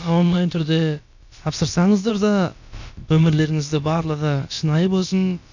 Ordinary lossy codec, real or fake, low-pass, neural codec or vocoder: none; fake; 7.2 kHz; codec, 16 kHz, about 1 kbps, DyCAST, with the encoder's durations